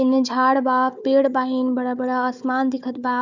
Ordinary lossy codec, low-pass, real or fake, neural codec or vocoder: none; 7.2 kHz; fake; codec, 16 kHz, 4 kbps, FunCodec, trained on Chinese and English, 50 frames a second